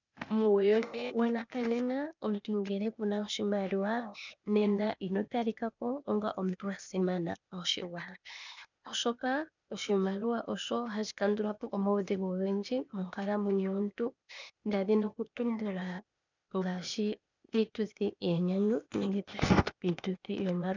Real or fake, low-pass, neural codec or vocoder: fake; 7.2 kHz; codec, 16 kHz, 0.8 kbps, ZipCodec